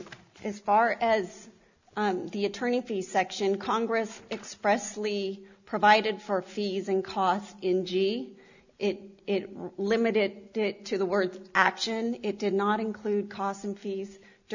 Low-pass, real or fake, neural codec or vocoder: 7.2 kHz; real; none